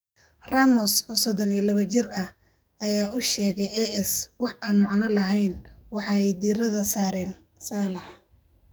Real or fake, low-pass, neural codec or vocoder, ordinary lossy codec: fake; none; codec, 44.1 kHz, 2.6 kbps, SNAC; none